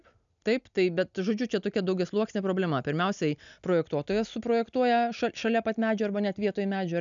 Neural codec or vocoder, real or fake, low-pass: none; real; 7.2 kHz